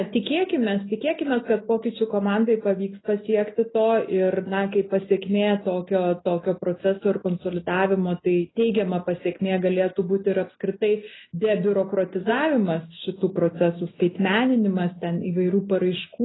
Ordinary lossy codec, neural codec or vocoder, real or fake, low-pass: AAC, 16 kbps; none; real; 7.2 kHz